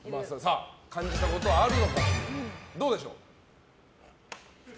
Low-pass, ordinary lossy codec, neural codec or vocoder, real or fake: none; none; none; real